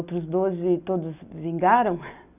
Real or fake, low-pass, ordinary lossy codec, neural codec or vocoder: real; 3.6 kHz; none; none